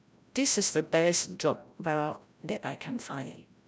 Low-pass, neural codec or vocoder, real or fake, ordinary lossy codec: none; codec, 16 kHz, 0.5 kbps, FreqCodec, larger model; fake; none